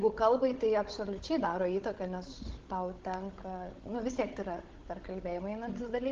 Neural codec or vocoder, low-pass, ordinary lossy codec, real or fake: codec, 16 kHz, 16 kbps, FunCodec, trained on Chinese and English, 50 frames a second; 7.2 kHz; Opus, 16 kbps; fake